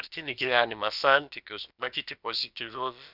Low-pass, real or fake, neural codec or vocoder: 5.4 kHz; fake; codec, 16 kHz, about 1 kbps, DyCAST, with the encoder's durations